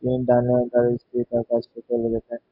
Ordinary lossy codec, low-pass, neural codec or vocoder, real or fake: AAC, 32 kbps; 5.4 kHz; none; real